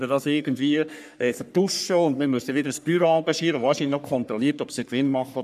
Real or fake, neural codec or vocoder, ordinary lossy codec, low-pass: fake; codec, 44.1 kHz, 3.4 kbps, Pupu-Codec; none; 14.4 kHz